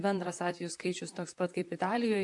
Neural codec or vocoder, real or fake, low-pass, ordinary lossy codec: vocoder, 44.1 kHz, 128 mel bands, Pupu-Vocoder; fake; 10.8 kHz; AAC, 64 kbps